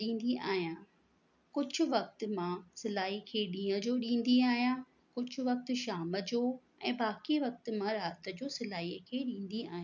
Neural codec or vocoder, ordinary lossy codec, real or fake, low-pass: none; none; real; 7.2 kHz